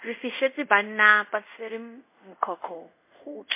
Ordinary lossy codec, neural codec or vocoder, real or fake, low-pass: MP3, 24 kbps; codec, 24 kHz, 0.5 kbps, DualCodec; fake; 3.6 kHz